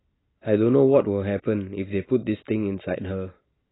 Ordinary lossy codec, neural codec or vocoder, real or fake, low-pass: AAC, 16 kbps; none; real; 7.2 kHz